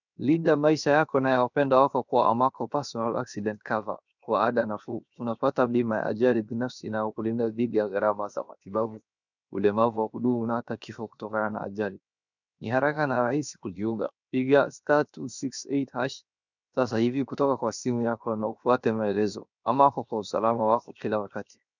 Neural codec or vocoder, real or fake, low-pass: codec, 16 kHz, 0.7 kbps, FocalCodec; fake; 7.2 kHz